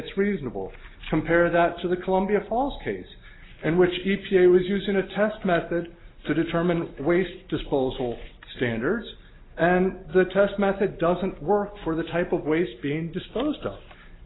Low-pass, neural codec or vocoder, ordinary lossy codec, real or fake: 7.2 kHz; none; AAC, 16 kbps; real